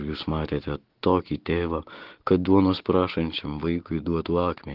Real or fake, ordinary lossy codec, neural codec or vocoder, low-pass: real; Opus, 16 kbps; none; 5.4 kHz